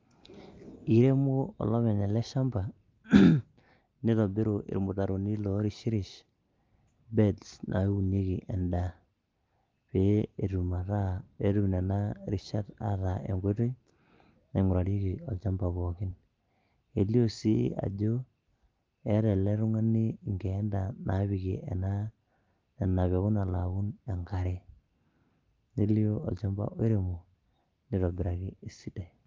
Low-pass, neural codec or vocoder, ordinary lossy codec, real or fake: 7.2 kHz; none; Opus, 24 kbps; real